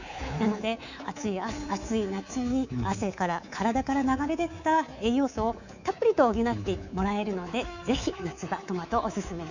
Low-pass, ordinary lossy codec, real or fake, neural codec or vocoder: 7.2 kHz; none; fake; codec, 24 kHz, 3.1 kbps, DualCodec